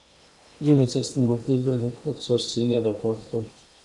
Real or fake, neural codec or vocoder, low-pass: fake; codec, 16 kHz in and 24 kHz out, 0.8 kbps, FocalCodec, streaming, 65536 codes; 10.8 kHz